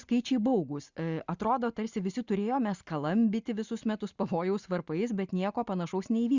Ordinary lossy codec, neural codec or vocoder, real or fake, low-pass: Opus, 64 kbps; none; real; 7.2 kHz